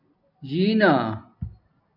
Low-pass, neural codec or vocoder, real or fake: 5.4 kHz; none; real